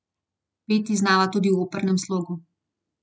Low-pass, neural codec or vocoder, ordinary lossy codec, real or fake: none; none; none; real